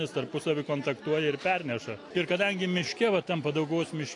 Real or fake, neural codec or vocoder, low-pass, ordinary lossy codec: real; none; 10.8 kHz; AAC, 48 kbps